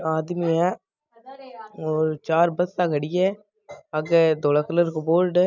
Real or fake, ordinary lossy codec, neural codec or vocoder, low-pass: real; none; none; 7.2 kHz